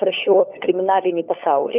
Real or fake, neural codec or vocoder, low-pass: fake; codec, 16 kHz, 4 kbps, FunCodec, trained on LibriTTS, 50 frames a second; 3.6 kHz